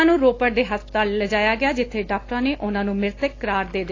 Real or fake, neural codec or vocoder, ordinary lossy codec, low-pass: real; none; AAC, 32 kbps; 7.2 kHz